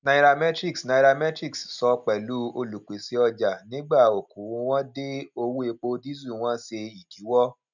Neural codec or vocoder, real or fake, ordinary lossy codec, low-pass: none; real; none; 7.2 kHz